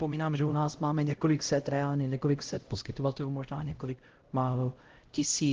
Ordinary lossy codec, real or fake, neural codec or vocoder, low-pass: Opus, 16 kbps; fake; codec, 16 kHz, 0.5 kbps, X-Codec, HuBERT features, trained on LibriSpeech; 7.2 kHz